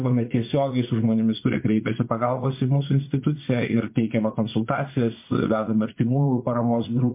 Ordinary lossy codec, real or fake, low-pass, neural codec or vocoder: MP3, 24 kbps; fake; 3.6 kHz; vocoder, 44.1 kHz, 80 mel bands, Vocos